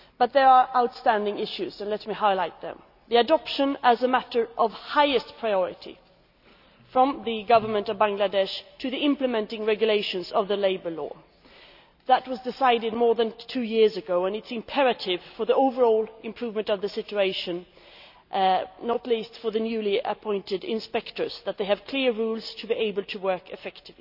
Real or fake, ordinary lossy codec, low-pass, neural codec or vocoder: real; none; 5.4 kHz; none